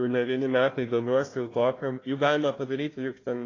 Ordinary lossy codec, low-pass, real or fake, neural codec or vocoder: AAC, 32 kbps; 7.2 kHz; fake; codec, 16 kHz, 1 kbps, FunCodec, trained on Chinese and English, 50 frames a second